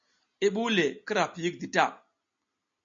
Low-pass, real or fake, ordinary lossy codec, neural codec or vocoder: 7.2 kHz; real; MP3, 64 kbps; none